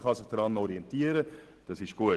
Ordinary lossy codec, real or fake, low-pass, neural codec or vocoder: Opus, 16 kbps; real; 10.8 kHz; none